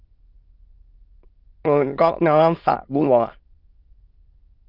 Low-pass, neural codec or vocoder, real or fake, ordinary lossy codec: 5.4 kHz; autoencoder, 22.05 kHz, a latent of 192 numbers a frame, VITS, trained on many speakers; fake; Opus, 32 kbps